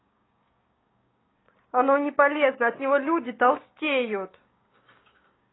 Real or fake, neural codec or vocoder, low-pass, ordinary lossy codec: fake; codec, 16 kHz, 6 kbps, DAC; 7.2 kHz; AAC, 16 kbps